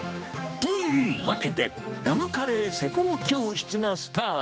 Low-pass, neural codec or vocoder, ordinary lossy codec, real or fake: none; codec, 16 kHz, 2 kbps, X-Codec, HuBERT features, trained on general audio; none; fake